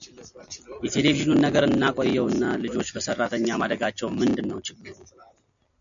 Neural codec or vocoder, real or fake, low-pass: none; real; 7.2 kHz